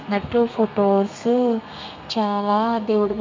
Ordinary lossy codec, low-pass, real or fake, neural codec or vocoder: MP3, 48 kbps; 7.2 kHz; fake; codec, 32 kHz, 1.9 kbps, SNAC